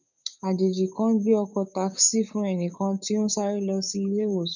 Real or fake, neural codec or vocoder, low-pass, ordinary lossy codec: fake; codec, 16 kHz, 6 kbps, DAC; 7.2 kHz; none